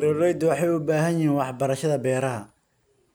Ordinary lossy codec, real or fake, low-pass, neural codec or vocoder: none; real; none; none